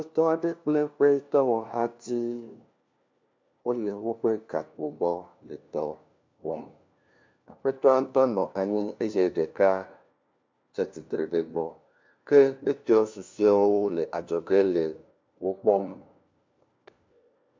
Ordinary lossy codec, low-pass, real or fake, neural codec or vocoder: MP3, 64 kbps; 7.2 kHz; fake; codec, 16 kHz, 1 kbps, FunCodec, trained on LibriTTS, 50 frames a second